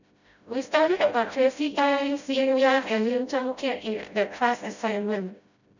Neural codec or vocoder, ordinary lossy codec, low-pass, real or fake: codec, 16 kHz, 0.5 kbps, FreqCodec, smaller model; none; 7.2 kHz; fake